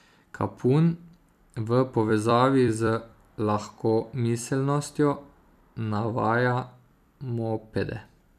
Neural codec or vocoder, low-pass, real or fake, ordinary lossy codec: vocoder, 44.1 kHz, 128 mel bands every 256 samples, BigVGAN v2; 14.4 kHz; fake; none